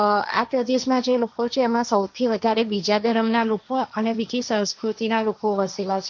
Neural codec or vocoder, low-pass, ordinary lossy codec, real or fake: codec, 16 kHz, 1.1 kbps, Voila-Tokenizer; 7.2 kHz; none; fake